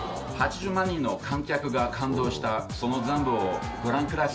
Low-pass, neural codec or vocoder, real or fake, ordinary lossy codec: none; none; real; none